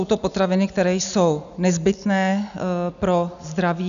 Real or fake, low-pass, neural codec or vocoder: real; 7.2 kHz; none